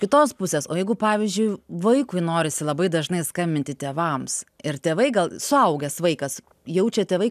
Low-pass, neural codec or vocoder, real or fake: 14.4 kHz; none; real